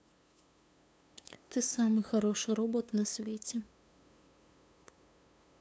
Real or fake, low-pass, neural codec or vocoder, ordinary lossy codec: fake; none; codec, 16 kHz, 2 kbps, FunCodec, trained on LibriTTS, 25 frames a second; none